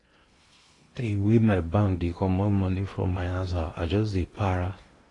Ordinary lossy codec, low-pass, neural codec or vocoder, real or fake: AAC, 32 kbps; 10.8 kHz; codec, 16 kHz in and 24 kHz out, 0.8 kbps, FocalCodec, streaming, 65536 codes; fake